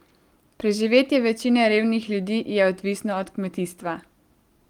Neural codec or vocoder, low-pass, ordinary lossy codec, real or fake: none; 19.8 kHz; Opus, 24 kbps; real